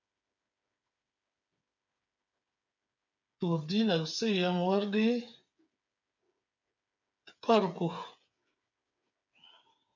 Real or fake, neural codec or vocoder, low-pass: fake; codec, 16 kHz, 8 kbps, FreqCodec, smaller model; 7.2 kHz